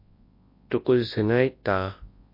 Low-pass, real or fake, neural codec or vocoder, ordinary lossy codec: 5.4 kHz; fake; codec, 24 kHz, 0.9 kbps, WavTokenizer, large speech release; MP3, 32 kbps